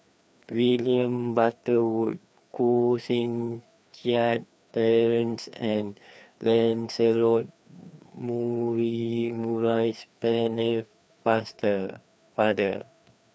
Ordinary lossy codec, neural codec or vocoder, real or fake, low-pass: none; codec, 16 kHz, 2 kbps, FreqCodec, larger model; fake; none